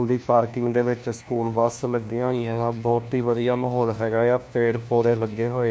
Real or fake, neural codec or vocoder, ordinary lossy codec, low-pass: fake; codec, 16 kHz, 1 kbps, FunCodec, trained on LibriTTS, 50 frames a second; none; none